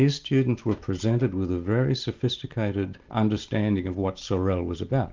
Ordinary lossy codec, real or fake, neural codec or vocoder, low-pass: Opus, 24 kbps; real; none; 7.2 kHz